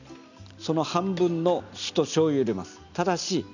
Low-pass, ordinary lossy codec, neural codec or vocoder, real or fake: 7.2 kHz; none; none; real